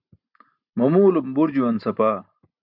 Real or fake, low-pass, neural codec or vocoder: real; 5.4 kHz; none